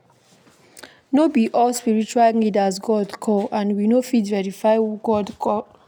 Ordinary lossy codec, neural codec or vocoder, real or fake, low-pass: none; none; real; 19.8 kHz